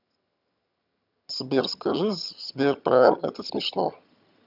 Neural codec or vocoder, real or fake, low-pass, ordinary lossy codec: vocoder, 22.05 kHz, 80 mel bands, HiFi-GAN; fake; 5.4 kHz; none